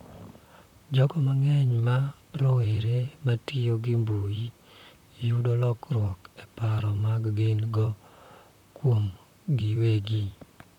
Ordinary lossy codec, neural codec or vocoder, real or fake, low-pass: none; vocoder, 44.1 kHz, 128 mel bands, Pupu-Vocoder; fake; 19.8 kHz